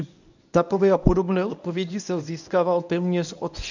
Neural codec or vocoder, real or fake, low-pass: codec, 24 kHz, 0.9 kbps, WavTokenizer, medium speech release version 1; fake; 7.2 kHz